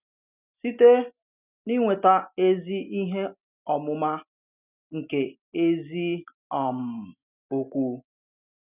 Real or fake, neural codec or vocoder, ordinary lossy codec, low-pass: real; none; none; 3.6 kHz